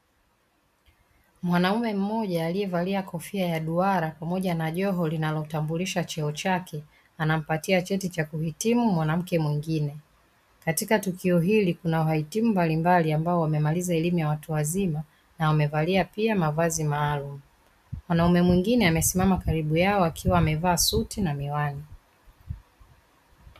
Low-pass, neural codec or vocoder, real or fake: 14.4 kHz; none; real